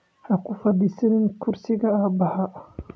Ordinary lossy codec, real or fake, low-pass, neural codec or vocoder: none; real; none; none